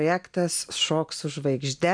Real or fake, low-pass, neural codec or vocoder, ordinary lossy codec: real; 9.9 kHz; none; MP3, 96 kbps